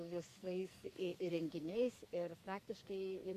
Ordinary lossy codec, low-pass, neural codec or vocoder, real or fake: AAC, 64 kbps; 14.4 kHz; codec, 44.1 kHz, 2.6 kbps, SNAC; fake